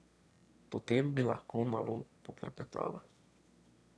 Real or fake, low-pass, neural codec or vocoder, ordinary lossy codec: fake; none; autoencoder, 22.05 kHz, a latent of 192 numbers a frame, VITS, trained on one speaker; none